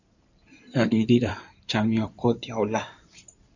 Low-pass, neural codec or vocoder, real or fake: 7.2 kHz; codec, 16 kHz in and 24 kHz out, 2.2 kbps, FireRedTTS-2 codec; fake